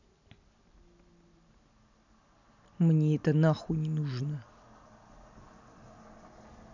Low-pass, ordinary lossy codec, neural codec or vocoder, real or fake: 7.2 kHz; none; none; real